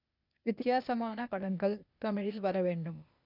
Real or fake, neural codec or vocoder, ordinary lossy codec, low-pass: fake; codec, 16 kHz, 0.8 kbps, ZipCodec; none; 5.4 kHz